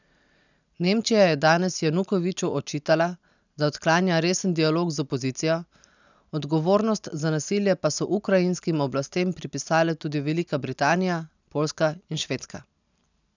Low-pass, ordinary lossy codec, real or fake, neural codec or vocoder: 7.2 kHz; none; real; none